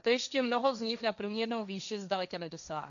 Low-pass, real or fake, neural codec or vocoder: 7.2 kHz; fake; codec, 16 kHz, 1.1 kbps, Voila-Tokenizer